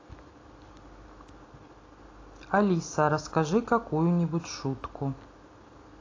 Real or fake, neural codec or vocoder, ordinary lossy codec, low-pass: real; none; AAC, 32 kbps; 7.2 kHz